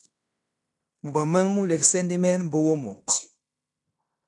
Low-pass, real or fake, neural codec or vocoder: 10.8 kHz; fake; codec, 16 kHz in and 24 kHz out, 0.9 kbps, LongCat-Audio-Codec, fine tuned four codebook decoder